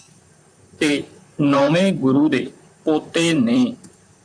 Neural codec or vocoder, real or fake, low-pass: vocoder, 44.1 kHz, 128 mel bands, Pupu-Vocoder; fake; 9.9 kHz